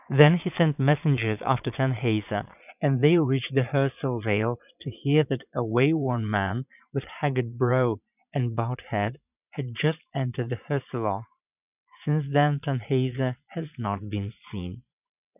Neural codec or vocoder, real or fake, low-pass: vocoder, 22.05 kHz, 80 mel bands, Vocos; fake; 3.6 kHz